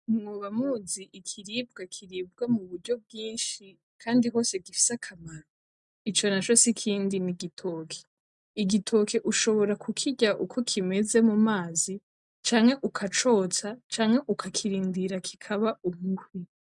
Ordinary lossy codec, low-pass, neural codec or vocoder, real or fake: MP3, 96 kbps; 10.8 kHz; none; real